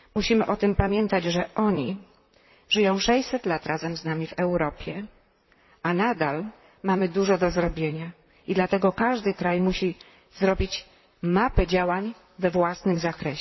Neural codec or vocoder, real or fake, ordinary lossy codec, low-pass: vocoder, 44.1 kHz, 128 mel bands, Pupu-Vocoder; fake; MP3, 24 kbps; 7.2 kHz